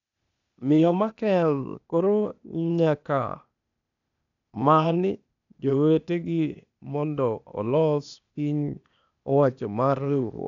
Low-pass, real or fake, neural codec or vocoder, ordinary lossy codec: 7.2 kHz; fake; codec, 16 kHz, 0.8 kbps, ZipCodec; none